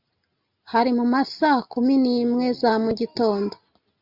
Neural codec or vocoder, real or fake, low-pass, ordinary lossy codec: none; real; 5.4 kHz; Opus, 64 kbps